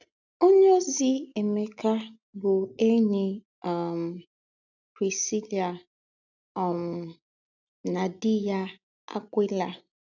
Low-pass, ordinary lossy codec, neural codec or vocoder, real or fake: 7.2 kHz; none; none; real